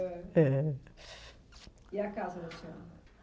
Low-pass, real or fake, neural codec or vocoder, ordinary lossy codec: none; real; none; none